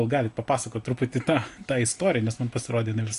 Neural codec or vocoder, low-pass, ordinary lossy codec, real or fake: none; 10.8 kHz; AAC, 48 kbps; real